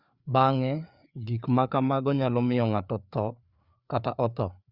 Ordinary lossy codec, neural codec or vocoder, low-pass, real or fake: Opus, 64 kbps; codec, 16 kHz, 4 kbps, FreqCodec, larger model; 5.4 kHz; fake